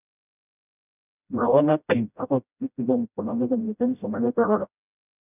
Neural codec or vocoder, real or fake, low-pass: codec, 16 kHz, 0.5 kbps, FreqCodec, smaller model; fake; 3.6 kHz